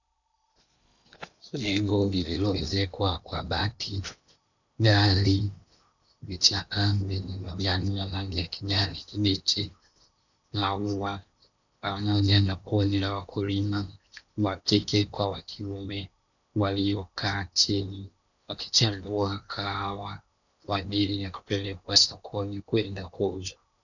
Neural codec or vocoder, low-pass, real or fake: codec, 16 kHz in and 24 kHz out, 0.8 kbps, FocalCodec, streaming, 65536 codes; 7.2 kHz; fake